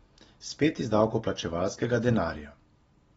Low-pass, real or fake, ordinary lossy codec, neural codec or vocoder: 19.8 kHz; real; AAC, 24 kbps; none